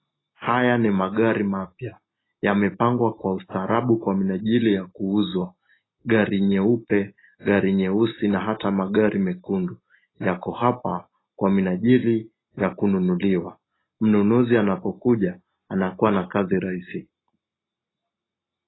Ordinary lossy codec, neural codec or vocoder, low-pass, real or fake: AAC, 16 kbps; none; 7.2 kHz; real